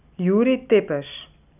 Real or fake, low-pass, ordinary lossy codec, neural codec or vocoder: real; 3.6 kHz; none; none